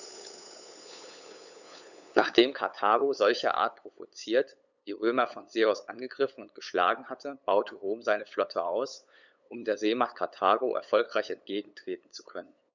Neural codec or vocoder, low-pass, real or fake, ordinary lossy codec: codec, 16 kHz, 8 kbps, FunCodec, trained on LibriTTS, 25 frames a second; 7.2 kHz; fake; none